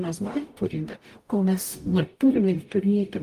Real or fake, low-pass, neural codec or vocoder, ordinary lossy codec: fake; 14.4 kHz; codec, 44.1 kHz, 0.9 kbps, DAC; Opus, 32 kbps